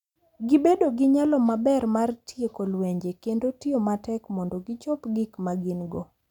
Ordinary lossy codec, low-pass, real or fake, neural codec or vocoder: none; 19.8 kHz; real; none